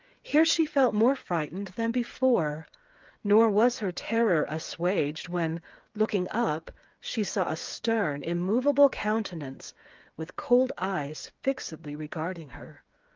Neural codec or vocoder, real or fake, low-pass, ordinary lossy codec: codec, 16 kHz, 8 kbps, FreqCodec, smaller model; fake; 7.2 kHz; Opus, 32 kbps